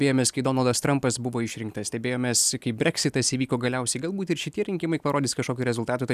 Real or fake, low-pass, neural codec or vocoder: real; 14.4 kHz; none